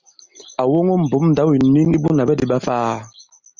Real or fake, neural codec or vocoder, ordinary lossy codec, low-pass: real; none; Opus, 64 kbps; 7.2 kHz